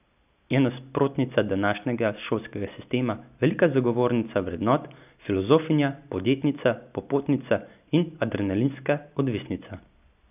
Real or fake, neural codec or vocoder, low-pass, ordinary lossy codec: real; none; 3.6 kHz; none